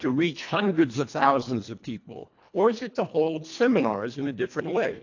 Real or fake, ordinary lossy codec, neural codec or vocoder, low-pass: fake; AAC, 48 kbps; codec, 24 kHz, 1.5 kbps, HILCodec; 7.2 kHz